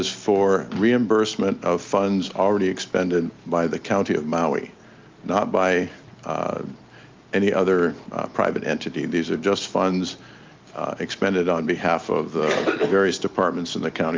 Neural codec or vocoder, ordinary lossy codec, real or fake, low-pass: none; Opus, 24 kbps; real; 7.2 kHz